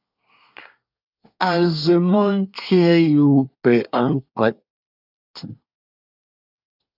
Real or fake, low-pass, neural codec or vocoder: fake; 5.4 kHz; codec, 24 kHz, 1 kbps, SNAC